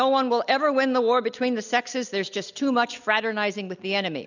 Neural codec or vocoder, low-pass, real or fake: none; 7.2 kHz; real